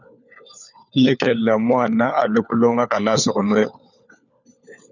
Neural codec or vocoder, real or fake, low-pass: codec, 16 kHz, 4 kbps, FunCodec, trained on LibriTTS, 50 frames a second; fake; 7.2 kHz